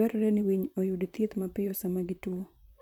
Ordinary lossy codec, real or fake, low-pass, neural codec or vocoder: none; fake; 19.8 kHz; vocoder, 44.1 kHz, 128 mel bands, Pupu-Vocoder